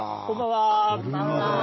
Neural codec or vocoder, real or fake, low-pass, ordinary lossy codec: none; real; 7.2 kHz; MP3, 24 kbps